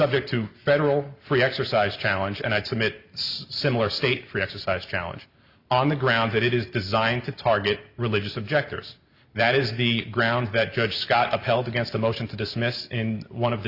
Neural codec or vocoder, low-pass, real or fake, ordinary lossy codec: none; 5.4 kHz; real; Opus, 64 kbps